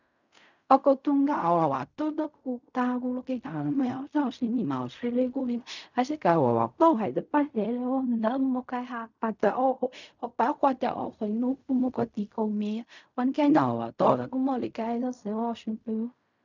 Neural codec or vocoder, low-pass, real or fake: codec, 16 kHz in and 24 kHz out, 0.4 kbps, LongCat-Audio-Codec, fine tuned four codebook decoder; 7.2 kHz; fake